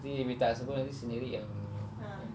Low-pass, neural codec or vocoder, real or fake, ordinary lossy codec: none; none; real; none